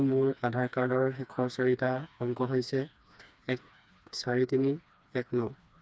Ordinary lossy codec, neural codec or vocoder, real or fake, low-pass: none; codec, 16 kHz, 2 kbps, FreqCodec, smaller model; fake; none